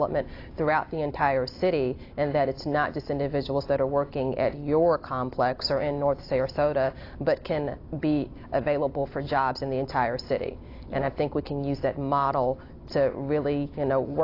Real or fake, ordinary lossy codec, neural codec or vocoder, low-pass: real; AAC, 32 kbps; none; 5.4 kHz